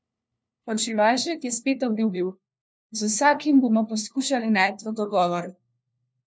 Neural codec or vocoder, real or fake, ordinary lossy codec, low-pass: codec, 16 kHz, 1 kbps, FunCodec, trained on LibriTTS, 50 frames a second; fake; none; none